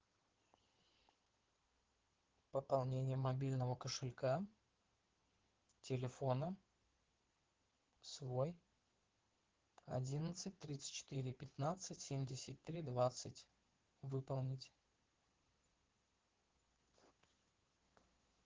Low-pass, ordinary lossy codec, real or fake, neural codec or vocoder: 7.2 kHz; Opus, 16 kbps; fake; codec, 16 kHz in and 24 kHz out, 2.2 kbps, FireRedTTS-2 codec